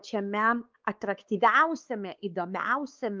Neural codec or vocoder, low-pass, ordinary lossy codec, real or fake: codec, 16 kHz, 4 kbps, X-Codec, WavLM features, trained on Multilingual LibriSpeech; 7.2 kHz; Opus, 32 kbps; fake